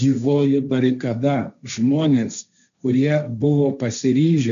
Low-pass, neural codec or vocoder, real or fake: 7.2 kHz; codec, 16 kHz, 1.1 kbps, Voila-Tokenizer; fake